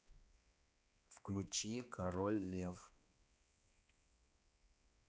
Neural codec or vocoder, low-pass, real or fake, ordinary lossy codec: codec, 16 kHz, 2 kbps, X-Codec, HuBERT features, trained on balanced general audio; none; fake; none